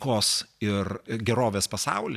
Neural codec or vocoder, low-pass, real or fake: none; 14.4 kHz; real